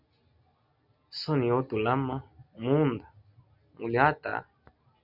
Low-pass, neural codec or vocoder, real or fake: 5.4 kHz; none; real